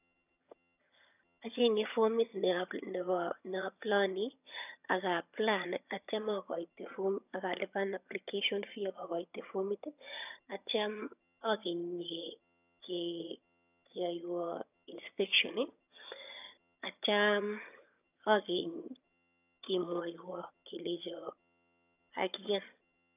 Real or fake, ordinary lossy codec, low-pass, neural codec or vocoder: fake; none; 3.6 kHz; vocoder, 22.05 kHz, 80 mel bands, HiFi-GAN